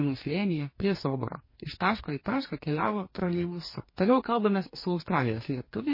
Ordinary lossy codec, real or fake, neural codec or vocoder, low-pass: MP3, 24 kbps; fake; codec, 44.1 kHz, 2.6 kbps, DAC; 5.4 kHz